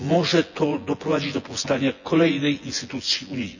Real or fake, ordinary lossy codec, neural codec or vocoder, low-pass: fake; none; vocoder, 24 kHz, 100 mel bands, Vocos; 7.2 kHz